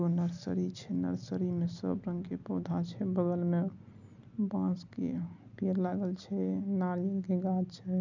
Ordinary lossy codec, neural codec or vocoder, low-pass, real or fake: none; none; 7.2 kHz; real